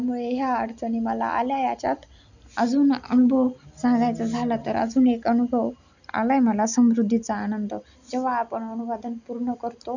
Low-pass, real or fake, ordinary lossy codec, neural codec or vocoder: 7.2 kHz; real; none; none